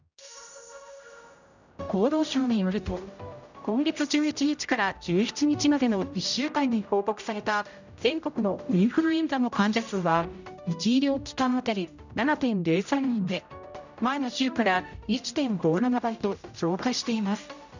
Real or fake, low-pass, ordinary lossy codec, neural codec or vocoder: fake; 7.2 kHz; none; codec, 16 kHz, 0.5 kbps, X-Codec, HuBERT features, trained on general audio